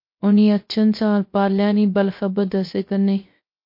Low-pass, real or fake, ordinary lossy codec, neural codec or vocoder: 5.4 kHz; fake; MP3, 32 kbps; codec, 16 kHz, 0.3 kbps, FocalCodec